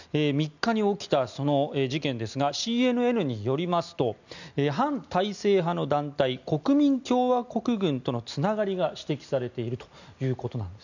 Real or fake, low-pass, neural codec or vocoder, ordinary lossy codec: real; 7.2 kHz; none; none